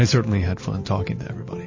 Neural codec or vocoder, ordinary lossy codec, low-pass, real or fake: none; MP3, 32 kbps; 7.2 kHz; real